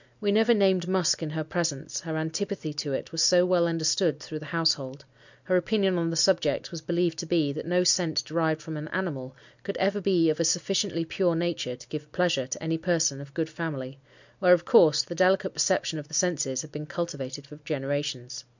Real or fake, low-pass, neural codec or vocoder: real; 7.2 kHz; none